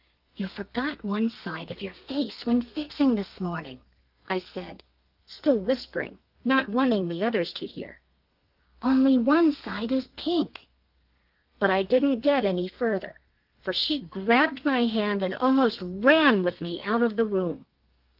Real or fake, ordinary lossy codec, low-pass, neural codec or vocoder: fake; Opus, 32 kbps; 5.4 kHz; codec, 32 kHz, 1.9 kbps, SNAC